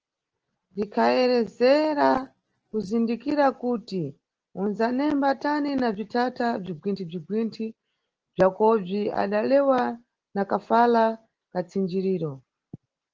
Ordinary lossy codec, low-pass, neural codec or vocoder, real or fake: Opus, 16 kbps; 7.2 kHz; none; real